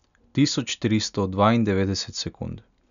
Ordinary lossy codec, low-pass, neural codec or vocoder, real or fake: none; 7.2 kHz; none; real